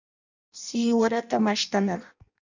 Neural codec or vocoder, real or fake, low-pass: codec, 16 kHz in and 24 kHz out, 0.6 kbps, FireRedTTS-2 codec; fake; 7.2 kHz